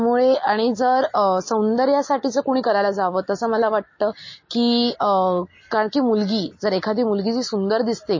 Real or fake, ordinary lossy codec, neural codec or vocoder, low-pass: real; MP3, 32 kbps; none; 7.2 kHz